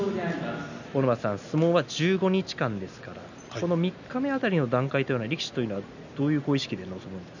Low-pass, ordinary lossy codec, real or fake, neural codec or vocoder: 7.2 kHz; none; real; none